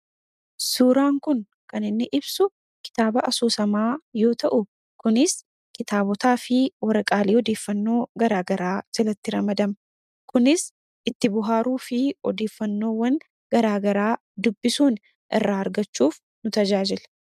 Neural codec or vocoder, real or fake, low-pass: none; real; 14.4 kHz